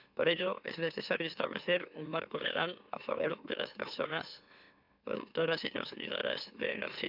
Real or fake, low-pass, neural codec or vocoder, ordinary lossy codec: fake; 5.4 kHz; autoencoder, 44.1 kHz, a latent of 192 numbers a frame, MeloTTS; none